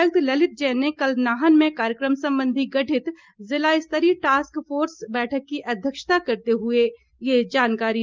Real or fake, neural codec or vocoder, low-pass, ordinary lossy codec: real; none; 7.2 kHz; Opus, 32 kbps